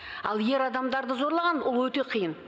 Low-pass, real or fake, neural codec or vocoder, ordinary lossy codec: none; real; none; none